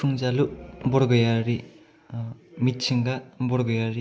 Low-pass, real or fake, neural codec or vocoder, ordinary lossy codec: none; real; none; none